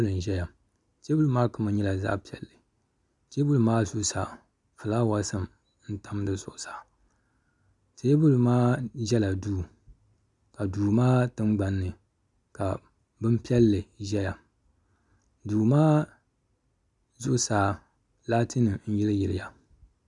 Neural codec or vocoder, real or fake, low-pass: none; real; 10.8 kHz